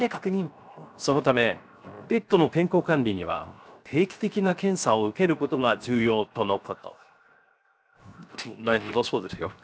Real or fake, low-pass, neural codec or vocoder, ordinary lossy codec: fake; none; codec, 16 kHz, 0.7 kbps, FocalCodec; none